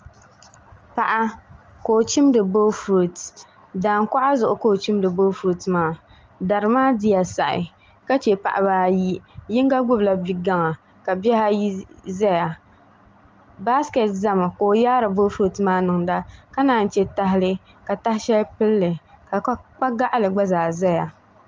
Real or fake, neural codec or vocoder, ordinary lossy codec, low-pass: real; none; Opus, 32 kbps; 7.2 kHz